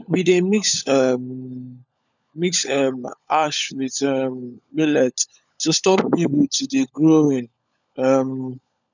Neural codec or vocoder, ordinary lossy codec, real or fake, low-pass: codec, 16 kHz, 16 kbps, FunCodec, trained on LibriTTS, 50 frames a second; none; fake; 7.2 kHz